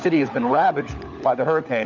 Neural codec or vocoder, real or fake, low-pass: codec, 16 kHz, 16 kbps, FunCodec, trained on LibriTTS, 50 frames a second; fake; 7.2 kHz